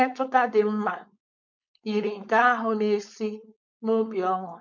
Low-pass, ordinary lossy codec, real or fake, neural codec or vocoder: 7.2 kHz; MP3, 64 kbps; fake; codec, 16 kHz, 4.8 kbps, FACodec